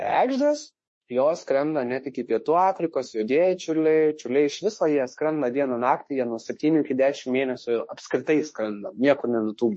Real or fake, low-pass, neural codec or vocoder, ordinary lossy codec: fake; 10.8 kHz; autoencoder, 48 kHz, 32 numbers a frame, DAC-VAE, trained on Japanese speech; MP3, 32 kbps